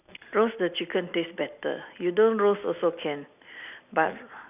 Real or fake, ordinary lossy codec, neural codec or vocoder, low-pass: real; none; none; 3.6 kHz